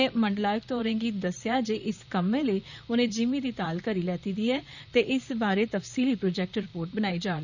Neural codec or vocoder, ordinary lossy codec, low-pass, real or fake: vocoder, 44.1 kHz, 128 mel bands, Pupu-Vocoder; none; 7.2 kHz; fake